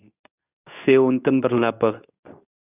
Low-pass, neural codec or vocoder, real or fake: 3.6 kHz; codec, 24 kHz, 0.9 kbps, WavTokenizer, medium speech release version 2; fake